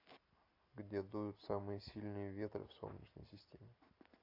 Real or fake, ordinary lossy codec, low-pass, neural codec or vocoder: real; AAC, 32 kbps; 5.4 kHz; none